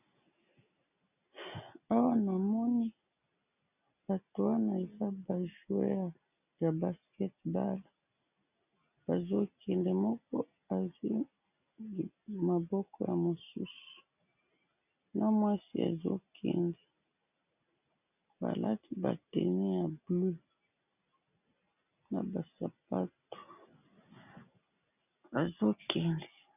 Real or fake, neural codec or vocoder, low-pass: real; none; 3.6 kHz